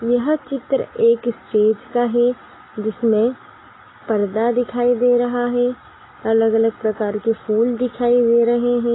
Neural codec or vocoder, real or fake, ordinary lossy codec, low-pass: none; real; AAC, 16 kbps; 7.2 kHz